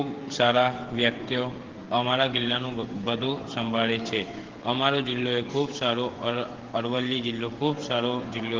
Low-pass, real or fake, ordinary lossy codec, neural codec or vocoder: 7.2 kHz; fake; Opus, 16 kbps; codec, 16 kHz, 16 kbps, FreqCodec, smaller model